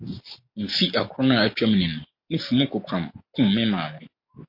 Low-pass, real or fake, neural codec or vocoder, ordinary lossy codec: 5.4 kHz; real; none; MP3, 32 kbps